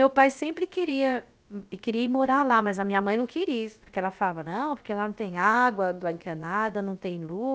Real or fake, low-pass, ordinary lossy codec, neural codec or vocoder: fake; none; none; codec, 16 kHz, about 1 kbps, DyCAST, with the encoder's durations